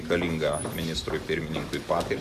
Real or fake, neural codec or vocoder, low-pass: real; none; 14.4 kHz